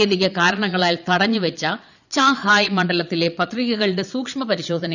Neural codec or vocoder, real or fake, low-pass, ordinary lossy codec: vocoder, 22.05 kHz, 80 mel bands, Vocos; fake; 7.2 kHz; none